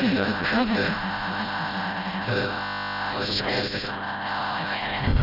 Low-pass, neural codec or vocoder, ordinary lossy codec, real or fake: 5.4 kHz; codec, 16 kHz, 0.5 kbps, FreqCodec, smaller model; none; fake